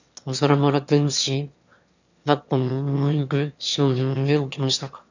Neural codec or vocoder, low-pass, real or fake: autoencoder, 22.05 kHz, a latent of 192 numbers a frame, VITS, trained on one speaker; 7.2 kHz; fake